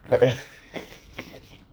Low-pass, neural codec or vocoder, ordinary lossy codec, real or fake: none; codec, 44.1 kHz, 2.6 kbps, SNAC; none; fake